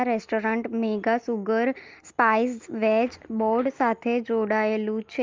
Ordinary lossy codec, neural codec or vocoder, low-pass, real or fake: Opus, 32 kbps; none; 7.2 kHz; real